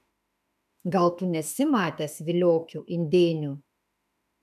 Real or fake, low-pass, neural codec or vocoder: fake; 14.4 kHz; autoencoder, 48 kHz, 32 numbers a frame, DAC-VAE, trained on Japanese speech